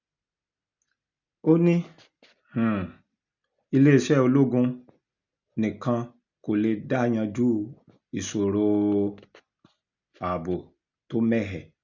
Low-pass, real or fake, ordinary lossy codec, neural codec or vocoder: 7.2 kHz; real; none; none